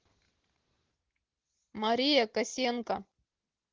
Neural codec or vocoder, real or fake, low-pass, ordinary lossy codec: none; real; 7.2 kHz; Opus, 16 kbps